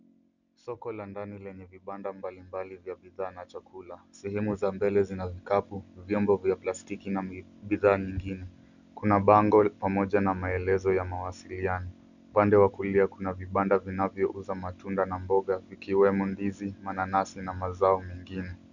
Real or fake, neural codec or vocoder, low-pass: real; none; 7.2 kHz